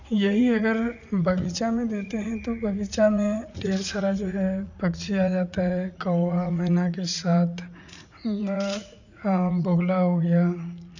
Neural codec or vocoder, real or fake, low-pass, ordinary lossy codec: vocoder, 44.1 kHz, 80 mel bands, Vocos; fake; 7.2 kHz; none